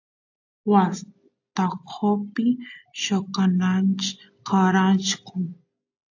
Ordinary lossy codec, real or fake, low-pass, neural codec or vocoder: AAC, 48 kbps; real; 7.2 kHz; none